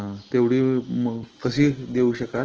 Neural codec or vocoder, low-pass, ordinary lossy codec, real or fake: none; 7.2 kHz; Opus, 16 kbps; real